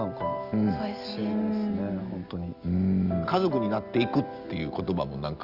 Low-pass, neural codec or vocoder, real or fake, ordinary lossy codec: 5.4 kHz; none; real; Opus, 32 kbps